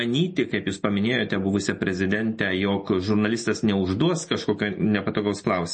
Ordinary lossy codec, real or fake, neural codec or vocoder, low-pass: MP3, 32 kbps; real; none; 10.8 kHz